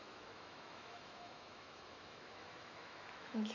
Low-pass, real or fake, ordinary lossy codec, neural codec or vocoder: 7.2 kHz; fake; none; autoencoder, 48 kHz, 128 numbers a frame, DAC-VAE, trained on Japanese speech